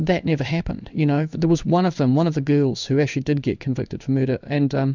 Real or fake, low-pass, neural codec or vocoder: fake; 7.2 kHz; codec, 16 kHz in and 24 kHz out, 1 kbps, XY-Tokenizer